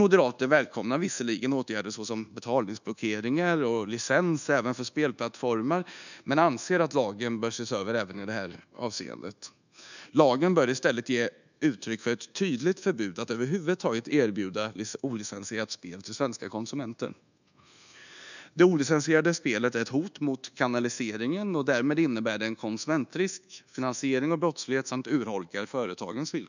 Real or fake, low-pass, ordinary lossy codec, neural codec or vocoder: fake; 7.2 kHz; none; codec, 24 kHz, 1.2 kbps, DualCodec